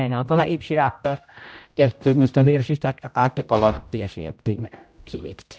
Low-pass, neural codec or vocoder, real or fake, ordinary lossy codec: none; codec, 16 kHz, 0.5 kbps, X-Codec, HuBERT features, trained on general audio; fake; none